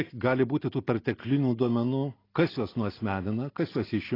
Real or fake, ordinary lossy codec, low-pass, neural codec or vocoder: real; AAC, 24 kbps; 5.4 kHz; none